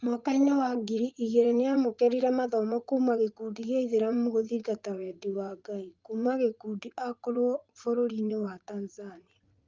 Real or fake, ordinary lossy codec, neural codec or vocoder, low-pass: fake; Opus, 32 kbps; vocoder, 44.1 kHz, 80 mel bands, Vocos; 7.2 kHz